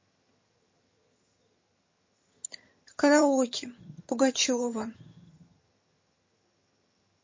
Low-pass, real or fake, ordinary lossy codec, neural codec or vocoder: 7.2 kHz; fake; MP3, 32 kbps; vocoder, 22.05 kHz, 80 mel bands, HiFi-GAN